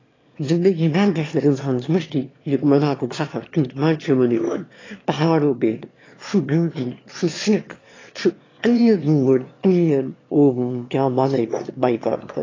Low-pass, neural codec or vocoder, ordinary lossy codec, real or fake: 7.2 kHz; autoencoder, 22.05 kHz, a latent of 192 numbers a frame, VITS, trained on one speaker; AAC, 32 kbps; fake